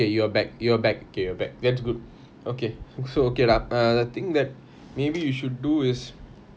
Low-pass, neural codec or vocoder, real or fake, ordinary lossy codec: none; none; real; none